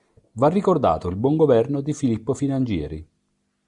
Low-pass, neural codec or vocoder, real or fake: 10.8 kHz; none; real